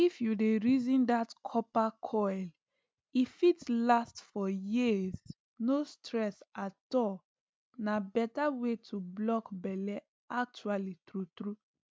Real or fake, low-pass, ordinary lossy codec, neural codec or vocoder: real; none; none; none